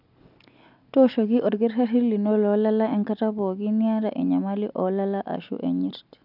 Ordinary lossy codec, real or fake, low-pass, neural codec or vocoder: none; real; 5.4 kHz; none